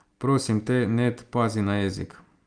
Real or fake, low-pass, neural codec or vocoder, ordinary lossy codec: real; 9.9 kHz; none; Opus, 32 kbps